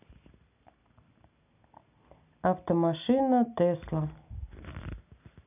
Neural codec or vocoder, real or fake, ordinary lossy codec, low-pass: none; real; none; 3.6 kHz